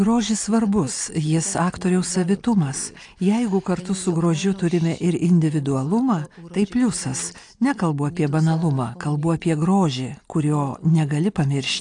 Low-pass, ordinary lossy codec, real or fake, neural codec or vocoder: 9.9 kHz; AAC, 64 kbps; real; none